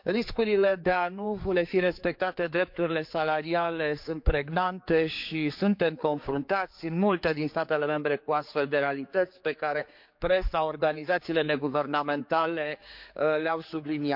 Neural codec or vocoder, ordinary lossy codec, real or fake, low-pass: codec, 16 kHz, 4 kbps, X-Codec, HuBERT features, trained on general audio; MP3, 48 kbps; fake; 5.4 kHz